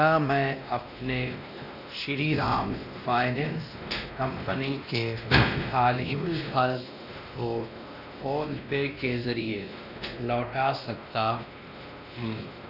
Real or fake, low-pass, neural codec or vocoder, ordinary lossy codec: fake; 5.4 kHz; codec, 16 kHz, 1 kbps, X-Codec, WavLM features, trained on Multilingual LibriSpeech; none